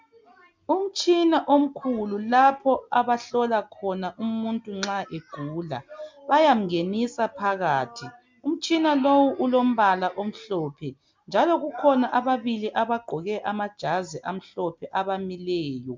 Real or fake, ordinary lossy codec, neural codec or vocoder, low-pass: real; MP3, 64 kbps; none; 7.2 kHz